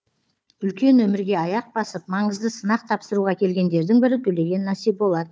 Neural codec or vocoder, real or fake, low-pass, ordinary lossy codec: codec, 16 kHz, 4 kbps, FunCodec, trained on Chinese and English, 50 frames a second; fake; none; none